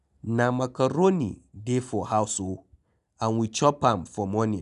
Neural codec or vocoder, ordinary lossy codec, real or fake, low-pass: none; none; real; 10.8 kHz